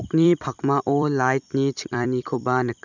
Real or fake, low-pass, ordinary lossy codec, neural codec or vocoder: fake; 7.2 kHz; none; vocoder, 44.1 kHz, 128 mel bands every 256 samples, BigVGAN v2